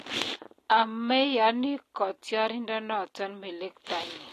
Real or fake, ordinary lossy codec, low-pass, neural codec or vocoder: real; AAC, 48 kbps; 14.4 kHz; none